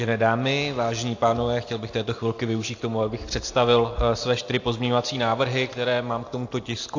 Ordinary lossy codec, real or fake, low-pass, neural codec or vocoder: AAC, 48 kbps; real; 7.2 kHz; none